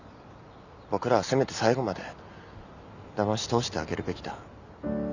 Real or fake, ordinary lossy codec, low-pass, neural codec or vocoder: real; none; 7.2 kHz; none